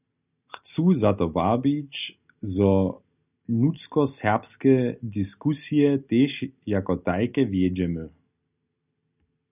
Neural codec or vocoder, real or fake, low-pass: none; real; 3.6 kHz